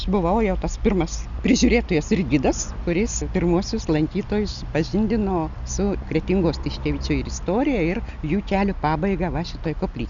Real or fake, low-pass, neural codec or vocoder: real; 7.2 kHz; none